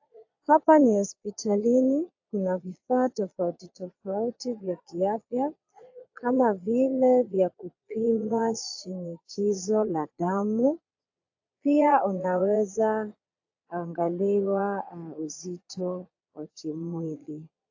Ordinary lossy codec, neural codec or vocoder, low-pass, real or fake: AAC, 48 kbps; vocoder, 22.05 kHz, 80 mel bands, Vocos; 7.2 kHz; fake